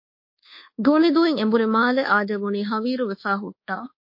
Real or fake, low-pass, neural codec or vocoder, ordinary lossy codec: fake; 5.4 kHz; codec, 24 kHz, 1.2 kbps, DualCodec; MP3, 32 kbps